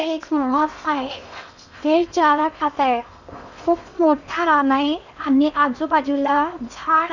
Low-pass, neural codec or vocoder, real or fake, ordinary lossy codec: 7.2 kHz; codec, 16 kHz in and 24 kHz out, 0.8 kbps, FocalCodec, streaming, 65536 codes; fake; none